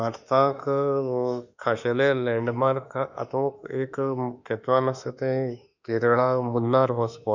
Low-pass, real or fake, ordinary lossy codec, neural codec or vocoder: 7.2 kHz; fake; none; autoencoder, 48 kHz, 32 numbers a frame, DAC-VAE, trained on Japanese speech